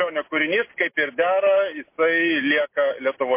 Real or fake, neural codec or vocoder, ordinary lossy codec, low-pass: real; none; MP3, 24 kbps; 3.6 kHz